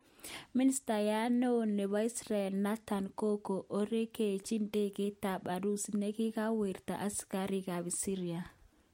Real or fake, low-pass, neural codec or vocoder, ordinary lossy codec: real; 19.8 kHz; none; MP3, 64 kbps